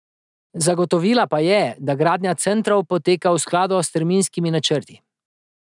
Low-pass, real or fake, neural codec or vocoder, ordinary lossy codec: 10.8 kHz; real; none; none